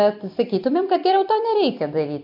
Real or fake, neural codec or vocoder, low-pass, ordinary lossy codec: real; none; 5.4 kHz; MP3, 48 kbps